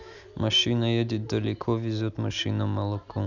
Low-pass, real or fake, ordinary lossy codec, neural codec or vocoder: 7.2 kHz; real; none; none